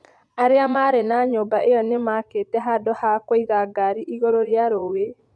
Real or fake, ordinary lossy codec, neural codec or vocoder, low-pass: fake; none; vocoder, 22.05 kHz, 80 mel bands, Vocos; none